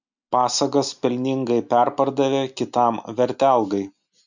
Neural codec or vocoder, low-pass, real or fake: none; 7.2 kHz; real